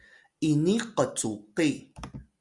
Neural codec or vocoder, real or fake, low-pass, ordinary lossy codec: none; real; 10.8 kHz; Opus, 64 kbps